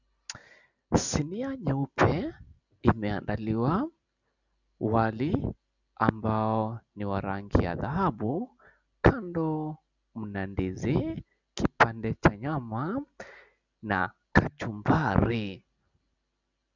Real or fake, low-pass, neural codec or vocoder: real; 7.2 kHz; none